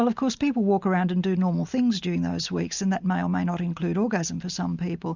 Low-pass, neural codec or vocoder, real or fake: 7.2 kHz; none; real